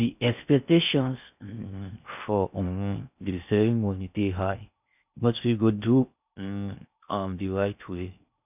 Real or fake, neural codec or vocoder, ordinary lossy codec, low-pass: fake; codec, 16 kHz in and 24 kHz out, 0.6 kbps, FocalCodec, streaming, 4096 codes; none; 3.6 kHz